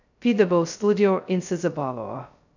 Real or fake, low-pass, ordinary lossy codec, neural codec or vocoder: fake; 7.2 kHz; none; codec, 16 kHz, 0.2 kbps, FocalCodec